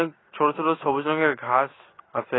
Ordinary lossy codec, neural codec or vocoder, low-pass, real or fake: AAC, 16 kbps; none; 7.2 kHz; real